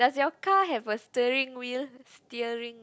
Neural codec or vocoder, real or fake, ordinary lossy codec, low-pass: none; real; none; none